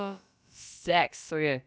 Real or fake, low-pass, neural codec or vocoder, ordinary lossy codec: fake; none; codec, 16 kHz, about 1 kbps, DyCAST, with the encoder's durations; none